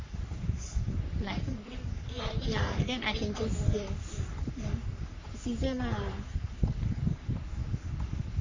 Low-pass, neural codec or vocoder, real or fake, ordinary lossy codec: 7.2 kHz; codec, 44.1 kHz, 3.4 kbps, Pupu-Codec; fake; MP3, 64 kbps